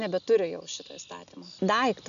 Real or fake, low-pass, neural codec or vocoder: real; 7.2 kHz; none